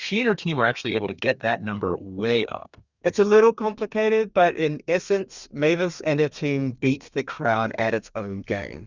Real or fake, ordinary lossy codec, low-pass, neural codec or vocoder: fake; Opus, 64 kbps; 7.2 kHz; codec, 32 kHz, 1.9 kbps, SNAC